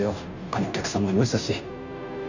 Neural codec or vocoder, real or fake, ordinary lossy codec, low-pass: codec, 16 kHz, 0.5 kbps, FunCodec, trained on Chinese and English, 25 frames a second; fake; none; 7.2 kHz